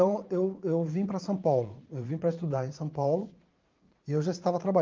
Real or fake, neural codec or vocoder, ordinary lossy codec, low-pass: fake; vocoder, 44.1 kHz, 80 mel bands, Vocos; Opus, 32 kbps; 7.2 kHz